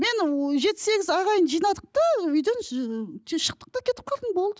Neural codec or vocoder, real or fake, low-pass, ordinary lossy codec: none; real; none; none